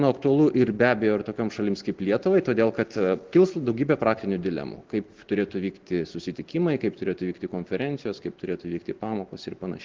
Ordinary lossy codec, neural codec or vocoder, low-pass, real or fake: Opus, 16 kbps; none; 7.2 kHz; real